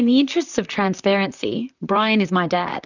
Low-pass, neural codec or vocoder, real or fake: 7.2 kHz; vocoder, 44.1 kHz, 128 mel bands, Pupu-Vocoder; fake